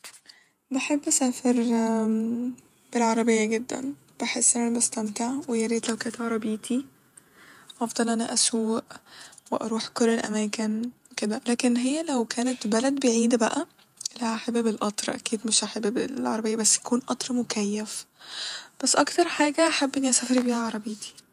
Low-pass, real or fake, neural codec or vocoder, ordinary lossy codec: 14.4 kHz; fake; vocoder, 48 kHz, 128 mel bands, Vocos; none